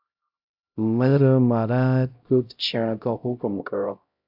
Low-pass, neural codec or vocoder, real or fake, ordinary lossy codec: 5.4 kHz; codec, 16 kHz, 0.5 kbps, X-Codec, HuBERT features, trained on LibriSpeech; fake; AAC, 48 kbps